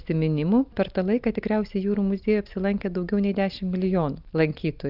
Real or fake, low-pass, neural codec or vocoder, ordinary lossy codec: real; 5.4 kHz; none; Opus, 32 kbps